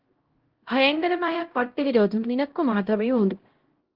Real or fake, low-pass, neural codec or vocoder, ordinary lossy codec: fake; 5.4 kHz; codec, 16 kHz, 0.5 kbps, X-Codec, HuBERT features, trained on LibriSpeech; Opus, 16 kbps